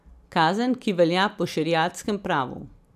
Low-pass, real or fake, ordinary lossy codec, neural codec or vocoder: 14.4 kHz; real; none; none